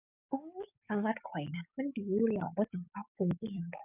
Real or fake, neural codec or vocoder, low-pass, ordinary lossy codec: fake; codec, 44.1 kHz, 7.8 kbps, DAC; 3.6 kHz; none